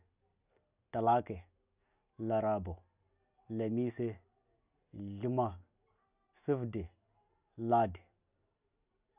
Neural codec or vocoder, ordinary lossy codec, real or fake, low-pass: none; none; real; 3.6 kHz